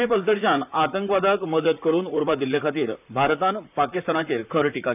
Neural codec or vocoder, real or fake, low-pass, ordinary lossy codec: vocoder, 44.1 kHz, 128 mel bands, Pupu-Vocoder; fake; 3.6 kHz; none